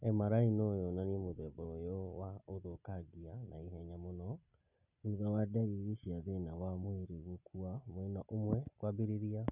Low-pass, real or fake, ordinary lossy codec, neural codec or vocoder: 3.6 kHz; real; none; none